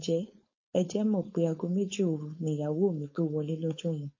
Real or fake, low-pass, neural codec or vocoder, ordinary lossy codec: fake; 7.2 kHz; codec, 16 kHz, 4.8 kbps, FACodec; MP3, 32 kbps